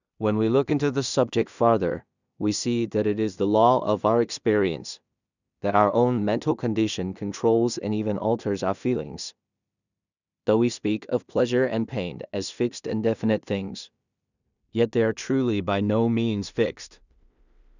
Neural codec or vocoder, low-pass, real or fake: codec, 16 kHz in and 24 kHz out, 0.4 kbps, LongCat-Audio-Codec, two codebook decoder; 7.2 kHz; fake